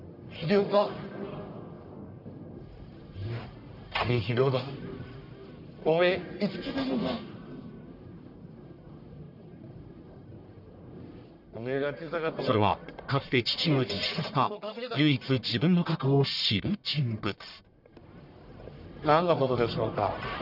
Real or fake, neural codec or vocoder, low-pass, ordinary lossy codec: fake; codec, 44.1 kHz, 1.7 kbps, Pupu-Codec; 5.4 kHz; none